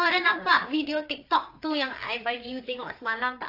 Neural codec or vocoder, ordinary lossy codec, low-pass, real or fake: codec, 16 kHz, 4 kbps, FreqCodec, larger model; AAC, 32 kbps; 5.4 kHz; fake